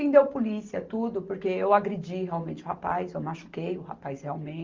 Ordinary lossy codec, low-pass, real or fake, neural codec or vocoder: Opus, 32 kbps; 7.2 kHz; real; none